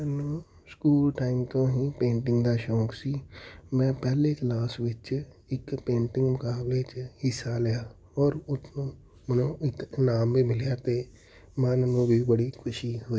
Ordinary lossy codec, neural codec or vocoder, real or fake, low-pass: none; none; real; none